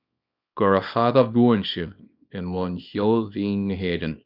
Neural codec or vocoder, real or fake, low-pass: codec, 24 kHz, 0.9 kbps, WavTokenizer, small release; fake; 5.4 kHz